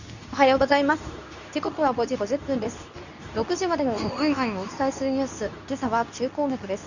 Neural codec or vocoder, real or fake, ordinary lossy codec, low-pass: codec, 24 kHz, 0.9 kbps, WavTokenizer, medium speech release version 2; fake; none; 7.2 kHz